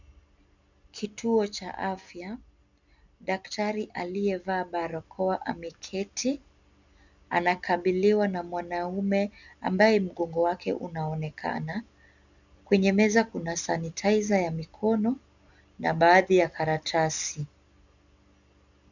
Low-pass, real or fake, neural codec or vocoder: 7.2 kHz; real; none